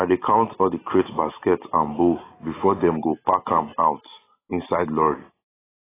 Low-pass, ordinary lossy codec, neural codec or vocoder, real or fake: 3.6 kHz; AAC, 16 kbps; none; real